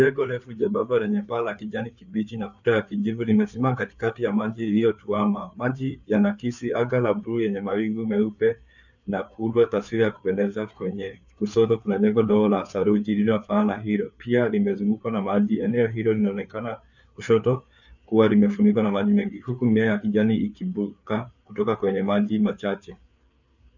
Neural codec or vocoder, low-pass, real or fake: codec, 16 kHz, 4 kbps, FreqCodec, larger model; 7.2 kHz; fake